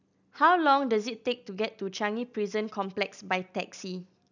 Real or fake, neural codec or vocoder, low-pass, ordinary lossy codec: real; none; 7.2 kHz; none